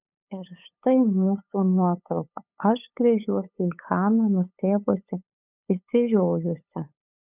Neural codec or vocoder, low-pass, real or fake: codec, 16 kHz, 8 kbps, FunCodec, trained on LibriTTS, 25 frames a second; 3.6 kHz; fake